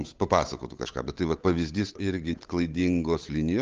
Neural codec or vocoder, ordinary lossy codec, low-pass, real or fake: codec, 16 kHz, 8 kbps, FunCodec, trained on Chinese and English, 25 frames a second; Opus, 24 kbps; 7.2 kHz; fake